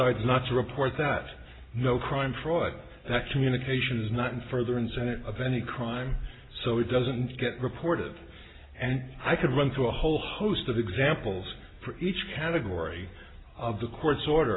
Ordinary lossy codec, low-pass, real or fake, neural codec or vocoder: AAC, 16 kbps; 7.2 kHz; real; none